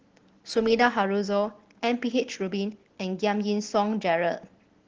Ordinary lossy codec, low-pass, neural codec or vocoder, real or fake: Opus, 16 kbps; 7.2 kHz; none; real